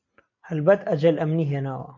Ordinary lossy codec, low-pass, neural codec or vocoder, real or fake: MP3, 48 kbps; 7.2 kHz; none; real